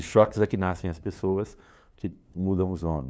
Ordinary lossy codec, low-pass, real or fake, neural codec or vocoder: none; none; fake; codec, 16 kHz, 2 kbps, FunCodec, trained on LibriTTS, 25 frames a second